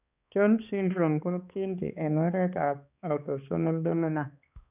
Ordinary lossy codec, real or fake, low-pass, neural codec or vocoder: none; fake; 3.6 kHz; codec, 16 kHz, 2 kbps, X-Codec, HuBERT features, trained on balanced general audio